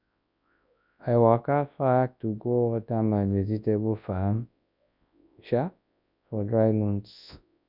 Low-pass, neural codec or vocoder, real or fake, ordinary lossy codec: 5.4 kHz; codec, 24 kHz, 0.9 kbps, WavTokenizer, large speech release; fake; none